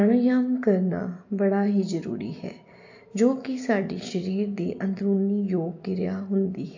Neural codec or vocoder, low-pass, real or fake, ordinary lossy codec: none; 7.2 kHz; real; AAC, 48 kbps